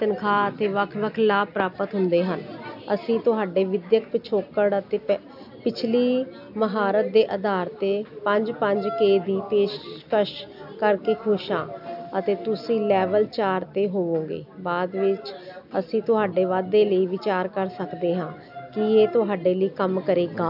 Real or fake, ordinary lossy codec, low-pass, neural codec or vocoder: real; AAC, 48 kbps; 5.4 kHz; none